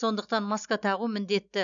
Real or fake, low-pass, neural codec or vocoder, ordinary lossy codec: real; 7.2 kHz; none; none